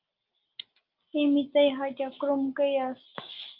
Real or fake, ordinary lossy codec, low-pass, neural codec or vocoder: real; Opus, 32 kbps; 5.4 kHz; none